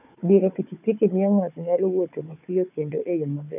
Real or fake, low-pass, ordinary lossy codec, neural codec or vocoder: fake; 3.6 kHz; none; codec, 16 kHz, 4 kbps, FunCodec, trained on Chinese and English, 50 frames a second